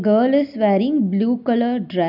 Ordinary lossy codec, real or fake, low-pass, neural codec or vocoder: none; real; 5.4 kHz; none